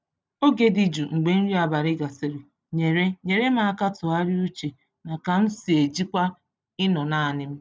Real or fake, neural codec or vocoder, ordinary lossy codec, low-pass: real; none; none; none